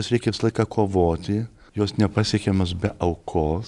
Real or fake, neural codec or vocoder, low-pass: real; none; 10.8 kHz